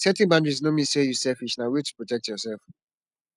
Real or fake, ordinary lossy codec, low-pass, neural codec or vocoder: real; none; 10.8 kHz; none